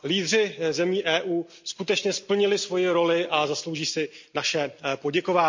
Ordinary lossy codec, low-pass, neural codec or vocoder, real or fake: MP3, 48 kbps; 7.2 kHz; none; real